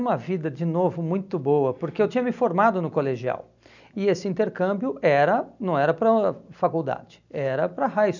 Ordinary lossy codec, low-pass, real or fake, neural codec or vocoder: none; 7.2 kHz; real; none